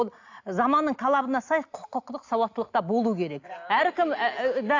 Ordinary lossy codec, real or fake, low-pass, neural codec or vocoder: AAC, 48 kbps; real; 7.2 kHz; none